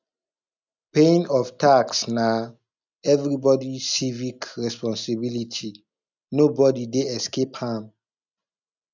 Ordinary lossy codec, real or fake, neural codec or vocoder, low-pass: none; real; none; 7.2 kHz